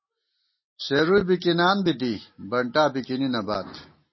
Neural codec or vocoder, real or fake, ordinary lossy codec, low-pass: none; real; MP3, 24 kbps; 7.2 kHz